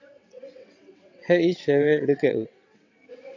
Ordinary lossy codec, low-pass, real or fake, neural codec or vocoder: AAC, 48 kbps; 7.2 kHz; fake; vocoder, 22.05 kHz, 80 mel bands, WaveNeXt